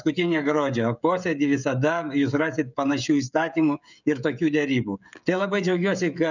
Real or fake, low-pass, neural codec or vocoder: fake; 7.2 kHz; codec, 16 kHz, 16 kbps, FreqCodec, smaller model